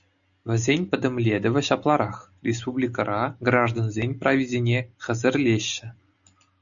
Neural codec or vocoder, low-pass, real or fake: none; 7.2 kHz; real